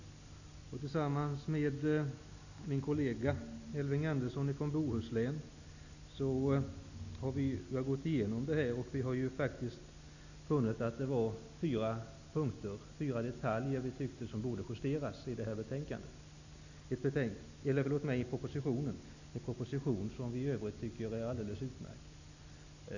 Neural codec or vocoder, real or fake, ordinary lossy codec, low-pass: none; real; none; 7.2 kHz